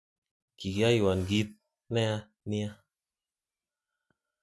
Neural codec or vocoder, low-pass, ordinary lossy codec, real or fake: none; none; none; real